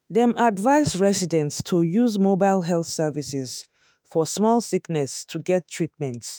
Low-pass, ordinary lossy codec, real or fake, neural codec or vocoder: none; none; fake; autoencoder, 48 kHz, 32 numbers a frame, DAC-VAE, trained on Japanese speech